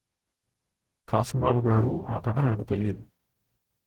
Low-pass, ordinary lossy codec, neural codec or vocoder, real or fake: 19.8 kHz; Opus, 16 kbps; codec, 44.1 kHz, 0.9 kbps, DAC; fake